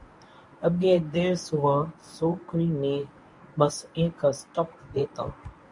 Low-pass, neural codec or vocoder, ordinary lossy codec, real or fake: 10.8 kHz; codec, 24 kHz, 0.9 kbps, WavTokenizer, medium speech release version 1; MP3, 48 kbps; fake